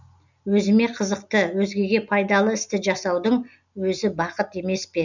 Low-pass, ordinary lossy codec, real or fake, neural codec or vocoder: 7.2 kHz; none; real; none